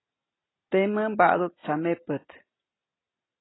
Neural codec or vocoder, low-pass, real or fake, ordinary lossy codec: none; 7.2 kHz; real; AAC, 16 kbps